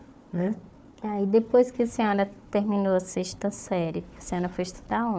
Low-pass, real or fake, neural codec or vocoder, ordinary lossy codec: none; fake; codec, 16 kHz, 4 kbps, FunCodec, trained on Chinese and English, 50 frames a second; none